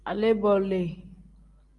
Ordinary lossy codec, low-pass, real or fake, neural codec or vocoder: Opus, 24 kbps; 10.8 kHz; real; none